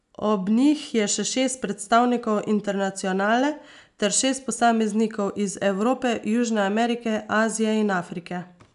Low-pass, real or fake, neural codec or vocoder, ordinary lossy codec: 10.8 kHz; real; none; none